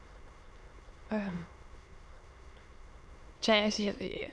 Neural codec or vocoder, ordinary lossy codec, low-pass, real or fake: autoencoder, 22.05 kHz, a latent of 192 numbers a frame, VITS, trained on many speakers; none; none; fake